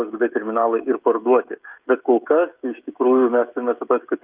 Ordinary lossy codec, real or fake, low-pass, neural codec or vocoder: Opus, 32 kbps; fake; 3.6 kHz; codec, 16 kHz, 16 kbps, FreqCodec, smaller model